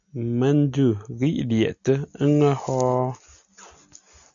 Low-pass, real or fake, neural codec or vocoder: 7.2 kHz; real; none